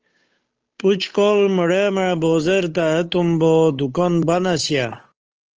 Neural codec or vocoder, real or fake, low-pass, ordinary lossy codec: codec, 16 kHz, 8 kbps, FunCodec, trained on Chinese and English, 25 frames a second; fake; 7.2 kHz; Opus, 24 kbps